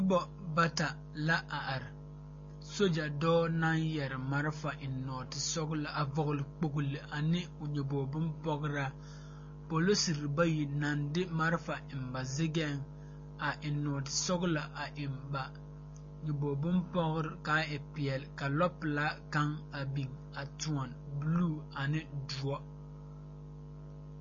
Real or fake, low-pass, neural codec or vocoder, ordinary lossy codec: real; 7.2 kHz; none; MP3, 32 kbps